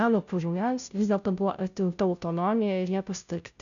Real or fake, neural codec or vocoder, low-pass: fake; codec, 16 kHz, 0.5 kbps, FunCodec, trained on Chinese and English, 25 frames a second; 7.2 kHz